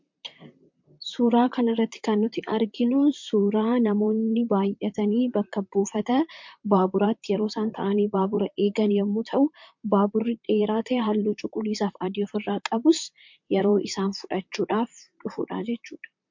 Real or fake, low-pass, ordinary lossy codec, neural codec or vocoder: fake; 7.2 kHz; MP3, 48 kbps; vocoder, 44.1 kHz, 128 mel bands, Pupu-Vocoder